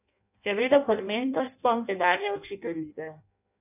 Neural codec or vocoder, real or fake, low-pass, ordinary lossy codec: codec, 16 kHz in and 24 kHz out, 0.6 kbps, FireRedTTS-2 codec; fake; 3.6 kHz; none